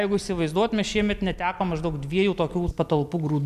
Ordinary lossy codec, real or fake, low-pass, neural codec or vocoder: MP3, 96 kbps; real; 14.4 kHz; none